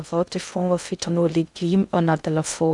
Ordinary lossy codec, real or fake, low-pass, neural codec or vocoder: none; fake; 10.8 kHz; codec, 16 kHz in and 24 kHz out, 0.6 kbps, FocalCodec, streaming, 2048 codes